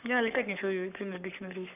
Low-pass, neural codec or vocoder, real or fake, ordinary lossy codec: 3.6 kHz; codec, 44.1 kHz, 7.8 kbps, Pupu-Codec; fake; none